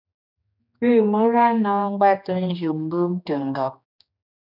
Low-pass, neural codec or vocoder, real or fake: 5.4 kHz; codec, 16 kHz, 2 kbps, X-Codec, HuBERT features, trained on general audio; fake